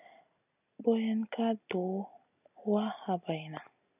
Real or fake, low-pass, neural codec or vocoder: real; 3.6 kHz; none